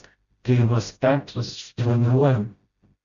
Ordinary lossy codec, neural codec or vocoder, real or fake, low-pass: Opus, 64 kbps; codec, 16 kHz, 0.5 kbps, FreqCodec, smaller model; fake; 7.2 kHz